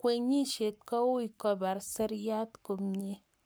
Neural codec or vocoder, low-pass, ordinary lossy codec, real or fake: codec, 44.1 kHz, 7.8 kbps, Pupu-Codec; none; none; fake